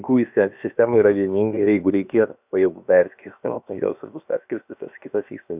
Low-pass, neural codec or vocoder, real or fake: 3.6 kHz; codec, 16 kHz, about 1 kbps, DyCAST, with the encoder's durations; fake